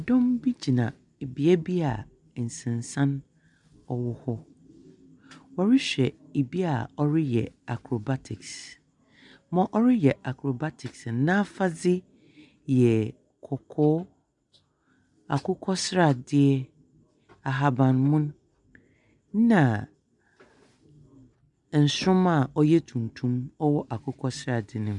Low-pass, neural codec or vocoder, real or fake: 10.8 kHz; none; real